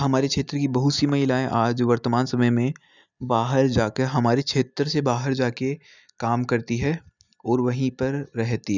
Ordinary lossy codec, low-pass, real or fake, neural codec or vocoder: none; 7.2 kHz; real; none